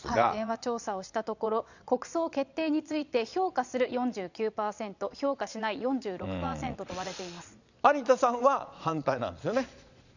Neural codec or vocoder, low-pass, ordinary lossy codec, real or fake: vocoder, 22.05 kHz, 80 mel bands, Vocos; 7.2 kHz; none; fake